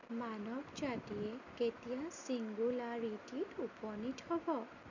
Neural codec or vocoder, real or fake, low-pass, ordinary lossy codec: none; real; 7.2 kHz; none